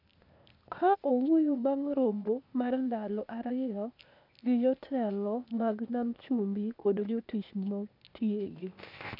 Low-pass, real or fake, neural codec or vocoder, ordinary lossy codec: 5.4 kHz; fake; codec, 16 kHz, 0.8 kbps, ZipCodec; none